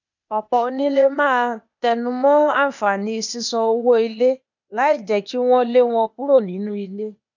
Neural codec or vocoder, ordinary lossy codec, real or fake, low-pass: codec, 16 kHz, 0.8 kbps, ZipCodec; none; fake; 7.2 kHz